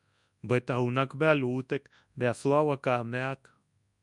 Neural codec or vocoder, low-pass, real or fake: codec, 24 kHz, 0.9 kbps, WavTokenizer, large speech release; 10.8 kHz; fake